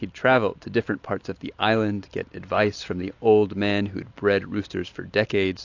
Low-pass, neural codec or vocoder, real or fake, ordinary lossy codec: 7.2 kHz; none; real; AAC, 48 kbps